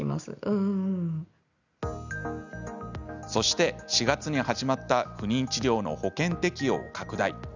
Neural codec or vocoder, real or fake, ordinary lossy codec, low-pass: none; real; none; 7.2 kHz